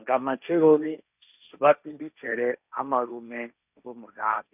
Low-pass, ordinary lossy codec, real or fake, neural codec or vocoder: 3.6 kHz; none; fake; codec, 16 kHz, 1.1 kbps, Voila-Tokenizer